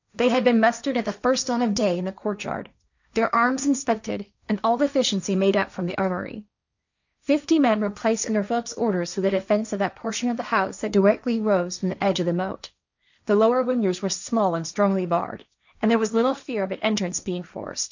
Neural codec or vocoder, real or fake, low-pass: codec, 16 kHz, 1.1 kbps, Voila-Tokenizer; fake; 7.2 kHz